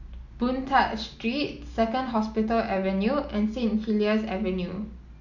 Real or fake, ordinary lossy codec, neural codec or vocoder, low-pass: real; none; none; 7.2 kHz